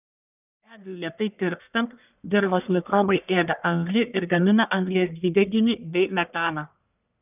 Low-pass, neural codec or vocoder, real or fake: 3.6 kHz; codec, 44.1 kHz, 1.7 kbps, Pupu-Codec; fake